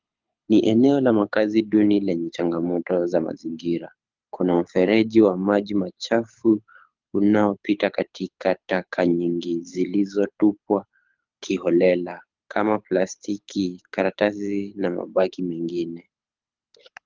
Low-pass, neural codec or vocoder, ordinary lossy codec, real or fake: 7.2 kHz; codec, 24 kHz, 6 kbps, HILCodec; Opus, 24 kbps; fake